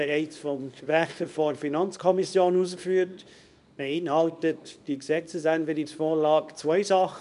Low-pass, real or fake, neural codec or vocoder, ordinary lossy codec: 10.8 kHz; fake; codec, 24 kHz, 0.9 kbps, WavTokenizer, small release; none